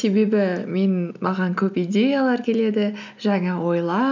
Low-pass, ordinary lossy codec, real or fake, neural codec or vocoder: 7.2 kHz; none; real; none